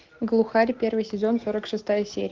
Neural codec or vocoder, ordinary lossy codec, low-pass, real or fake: none; Opus, 16 kbps; 7.2 kHz; real